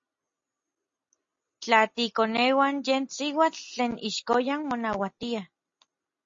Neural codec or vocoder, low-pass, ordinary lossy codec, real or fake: none; 7.2 kHz; MP3, 32 kbps; real